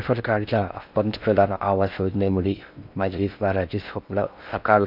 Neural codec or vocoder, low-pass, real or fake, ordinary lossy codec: codec, 16 kHz in and 24 kHz out, 0.6 kbps, FocalCodec, streaming, 4096 codes; 5.4 kHz; fake; none